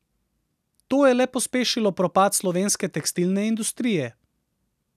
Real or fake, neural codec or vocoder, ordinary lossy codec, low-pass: real; none; none; 14.4 kHz